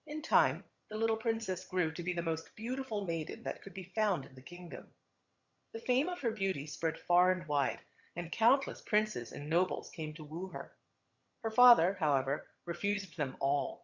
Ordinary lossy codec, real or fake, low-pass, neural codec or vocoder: Opus, 64 kbps; fake; 7.2 kHz; vocoder, 22.05 kHz, 80 mel bands, HiFi-GAN